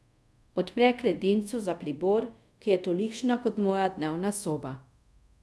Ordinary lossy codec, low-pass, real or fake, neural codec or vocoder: none; none; fake; codec, 24 kHz, 0.5 kbps, DualCodec